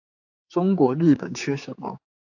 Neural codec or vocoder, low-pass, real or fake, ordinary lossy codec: codec, 16 kHz, 4 kbps, X-Codec, HuBERT features, trained on general audio; 7.2 kHz; fake; AAC, 48 kbps